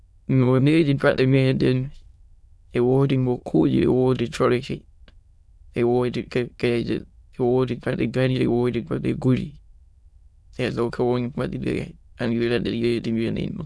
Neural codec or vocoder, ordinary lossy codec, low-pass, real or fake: autoencoder, 22.05 kHz, a latent of 192 numbers a frame, VITS, trained on many speakers; none; none; fake